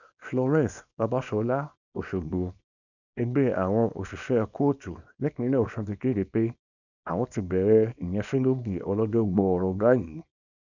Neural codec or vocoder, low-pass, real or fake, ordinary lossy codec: codec, 24 kHz, 0.9 kbps, WavTokenizer, small release; 7.2 kHz; fake; none